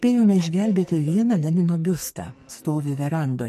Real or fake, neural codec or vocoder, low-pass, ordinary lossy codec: fake; codec, 44.1 kHz, 2.6 kbps, SNAC; 14.4 kHz; MP3, 64 kbps